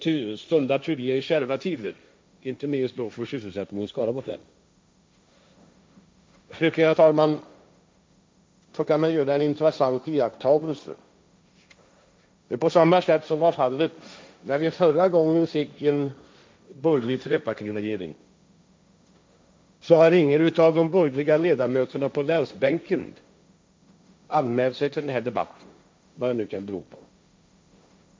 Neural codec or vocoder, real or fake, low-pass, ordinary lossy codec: codec, 16 kHz, 1.1 kbps, Voila-Tokenizer; fake; none; none